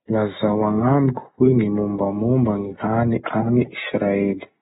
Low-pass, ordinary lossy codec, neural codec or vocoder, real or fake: 19.8 kHz; AAC, 16 kbps; none; real